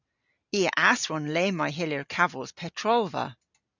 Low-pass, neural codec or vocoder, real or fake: 7.2 kHz; none; real